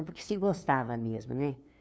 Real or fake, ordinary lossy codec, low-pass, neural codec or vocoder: fake; none; none; codec, 16 kHz, 2 kbps, FunCodec, trained on LibriTTS, 25 frames a second